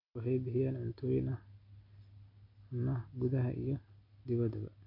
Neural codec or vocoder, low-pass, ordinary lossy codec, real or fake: none; 5.4 kHz; none; real